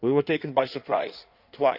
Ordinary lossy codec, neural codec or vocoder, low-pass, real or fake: none; codec, 16 kHz in and 24 kHz out, 1.1 kbps, FireRedTTS-2 codec; 5.4 kHz; fake